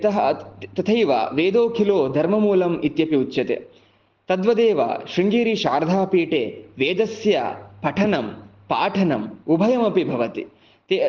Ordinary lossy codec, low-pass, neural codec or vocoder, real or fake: Opus, 16 kbps; 7.2 kHz; none; real